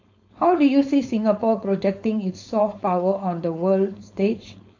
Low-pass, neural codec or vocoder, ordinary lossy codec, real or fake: 7.2 kHz; codec, 16 kHz, 4.8 kbps, FACodec; AAC, 48 kbps; fake